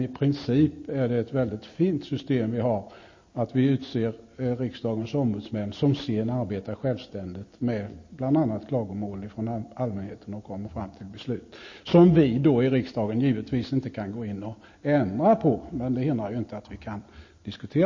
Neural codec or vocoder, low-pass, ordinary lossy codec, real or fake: none; 7.2 kHz; MP3, 32 kbps; real